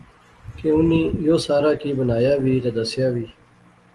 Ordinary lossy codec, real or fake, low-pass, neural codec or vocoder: Opus, 24 kbps; real; 10.8 kHz; none